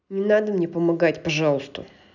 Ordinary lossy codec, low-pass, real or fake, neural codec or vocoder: none; 7.2 kHz; real; none